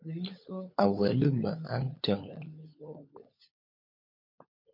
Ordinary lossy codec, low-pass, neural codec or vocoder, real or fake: MP3, 32 kbps; 5.4 kHz; codec, 16 kHz, 16 kbps, FunCodec, trained on LibriTTS, 50 frames a second; fake